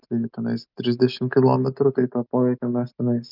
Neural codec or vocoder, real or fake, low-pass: none; real; 5.4 kHz